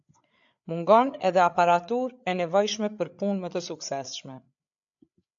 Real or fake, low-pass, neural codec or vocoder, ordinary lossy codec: fake; 7.2 kHz; codec, 16 kHz, 8 kbps, FreqCodec, larger model; AAC, 64 kbps